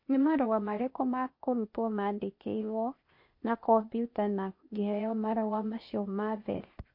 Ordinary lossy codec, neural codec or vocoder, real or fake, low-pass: MP3, 32 kbps; codec, 16 kHz, 0.8 kbps, ZipCodec; fake; 5.4 kHz